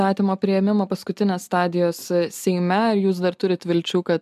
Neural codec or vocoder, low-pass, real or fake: none; 14.4 kHz; real